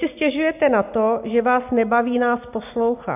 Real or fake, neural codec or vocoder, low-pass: real; none; 3.6 kHz